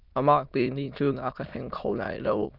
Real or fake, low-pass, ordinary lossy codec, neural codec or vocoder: fake; 5.4 kHz; Opus, 24 kbps; autoencoder, 22.05 kHz, a latent of 192 numbers a frame, VITS, trained on many speakers